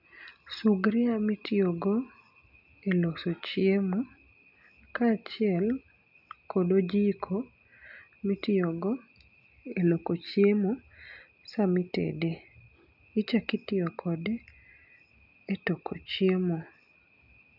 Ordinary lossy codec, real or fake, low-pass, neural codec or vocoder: none; real; 5.4 kHz; none